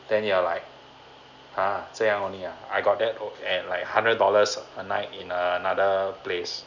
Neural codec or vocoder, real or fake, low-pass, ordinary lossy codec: none; real; 7.2 kHz; none